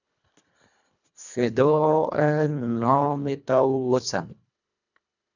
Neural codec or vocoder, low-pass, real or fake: codec, 24 kHz, 1.5 kbps, HILCodec; 7.2 kHz; fake